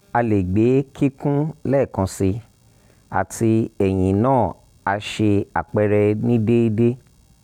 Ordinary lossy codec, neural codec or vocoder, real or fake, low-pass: none; none; real; 19.8 kHz